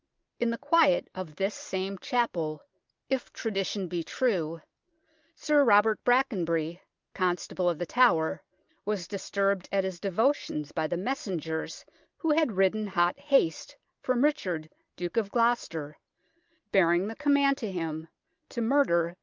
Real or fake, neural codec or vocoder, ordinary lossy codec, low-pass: real; none; Opus, 24 kbps; 7.2 kHz